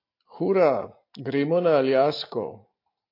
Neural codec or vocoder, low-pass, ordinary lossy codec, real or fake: none; 5.4 kHz; AAC, 32 kbps; real